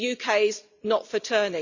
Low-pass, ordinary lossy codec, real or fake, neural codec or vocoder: 7.2 kHz; none; real; none